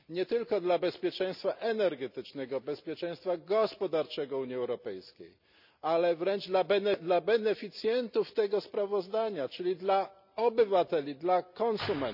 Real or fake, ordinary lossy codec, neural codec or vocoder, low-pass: real; none; none; 5.4 kHz